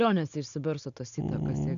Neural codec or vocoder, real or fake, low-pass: none; real; 7.2 kHz